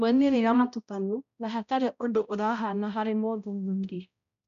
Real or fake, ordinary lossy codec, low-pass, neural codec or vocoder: fake; none; 7.2 kHz; codec, 16 kHz, 0.5 kbps, X-Codec, HuBERT features, trained on balanced general audio